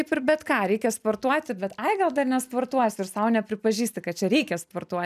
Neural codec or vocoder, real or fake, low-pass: none; real; 14.4 kHz